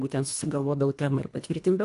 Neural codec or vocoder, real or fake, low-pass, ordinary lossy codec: codec, 24 kHz, 1.5 kbps, HILCodec; fake; 10.8 kHz; AAC, 64 kbps